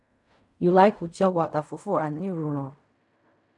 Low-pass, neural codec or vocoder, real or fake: 10.8 kHz; codec, 16 kHz in and 24 kHz out, 0.4 kbps, LongCat-Audio-Codec, fine tuned four codebook decoder; fake